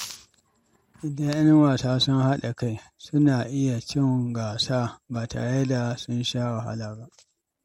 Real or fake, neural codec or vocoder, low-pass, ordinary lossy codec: real; none; 19.8 kHz; MP3, 64 kbps